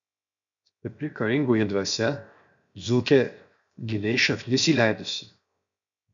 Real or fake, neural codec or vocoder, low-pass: fake; codec, 16 kHz, 0.7 kbps, FocalCodec; 7.2 kHz